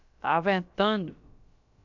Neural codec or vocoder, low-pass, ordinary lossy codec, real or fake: codec, 16 kHz, about 1 kbps, DyCAST, with the encoder's durations; 7.2 kHz; none; fake